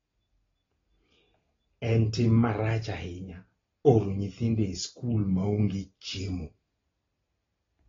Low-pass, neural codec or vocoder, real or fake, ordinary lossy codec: 7.2 kHz; none; real; AAC, 24 kbps